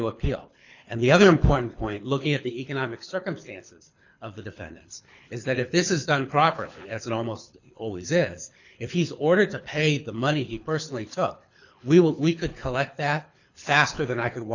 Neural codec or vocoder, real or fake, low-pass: codec, 24 kHz, 6 kbps, HILCodec; fake; 7.2 kHz